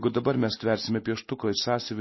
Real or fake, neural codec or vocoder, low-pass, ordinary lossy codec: real; none; 7.2 kHz; MP3, 24 kbps